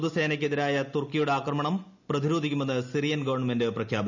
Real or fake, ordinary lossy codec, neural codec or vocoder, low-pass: real; none; none; 7.2 kHz